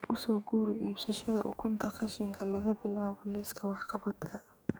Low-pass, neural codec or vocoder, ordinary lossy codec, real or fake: none; codec, 44.1 kHz, 2.6 kbps, DAC; none; fake